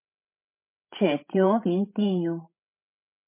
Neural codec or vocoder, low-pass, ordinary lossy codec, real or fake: codec, 16 kHz, 16 kbps, FreqCodec, larger model; 3.6 kHz; MP3, 24 kbps; fake